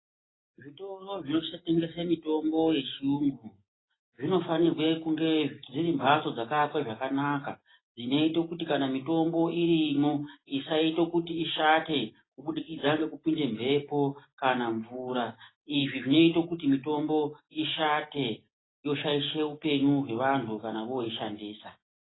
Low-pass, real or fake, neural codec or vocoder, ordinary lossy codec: 7.2 kHz; real; none; AAC, 16 kbps